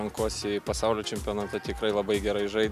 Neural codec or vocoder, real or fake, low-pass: none; real; 14.4 kHz